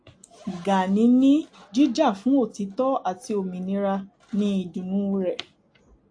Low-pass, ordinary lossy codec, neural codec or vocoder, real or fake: 9.9 kHz; AAC, 48 kbps; none; real